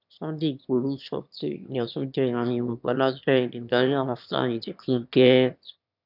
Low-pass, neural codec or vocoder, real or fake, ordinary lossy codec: 5.4 kHz; autoencoder, 22.05 kHz, a latent of 192 numbers a frame, VITS, trained on one speaker; fake; none